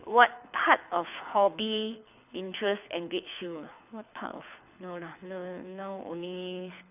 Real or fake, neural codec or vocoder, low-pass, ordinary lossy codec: fake; codec, 24 kHz, 6 kbps, HILCodec; 3.6 kHz; none